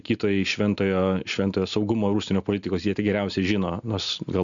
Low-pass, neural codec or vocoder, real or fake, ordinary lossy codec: 7.2 kHz; none; real; MP3, 96 kbps